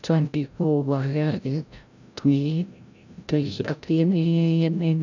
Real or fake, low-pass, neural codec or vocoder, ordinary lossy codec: fake; 7.2 kHz; codec, 16 kHz, 0.5 kbps, FreqCodec, larger model; none